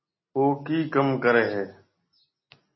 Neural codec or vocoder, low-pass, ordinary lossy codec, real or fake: none; 7.2 kHz; MP3, 24 kbps; real